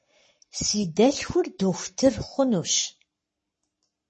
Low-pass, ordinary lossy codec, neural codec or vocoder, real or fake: 10.8 kHz; MP3, 32 kbps; codec, 44.1 kHz, 7.8 kbps, Pupu-Codec; fake